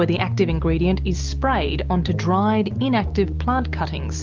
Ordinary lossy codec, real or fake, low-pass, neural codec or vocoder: Opus, 32 kbps; real; 7.2 kHz; none